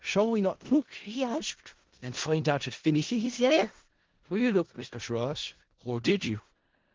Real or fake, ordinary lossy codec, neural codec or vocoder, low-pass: fake; Opus, 16 kbps; codec, 16 kHz in and 24 kHz out, 0.4 kbps, LongCat-Audio-Codec, four codebook decoder; 7.2 kHz